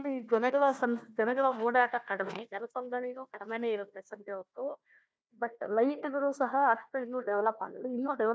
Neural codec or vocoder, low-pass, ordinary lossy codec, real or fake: codec, 16 kHz, 1 kbps, FunCodec, trained on Chinese and English, 50 frames a second; none; none; fake